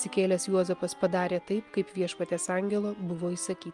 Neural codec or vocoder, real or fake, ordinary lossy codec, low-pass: none; real; Opus, 32 kbps; 10.8 kHz